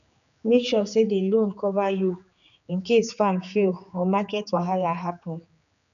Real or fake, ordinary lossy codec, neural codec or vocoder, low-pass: fake; none; codec, 16 kHz, 4 kbps, X-Codec, HuBERT features, trained on general audio; 7.2 kHz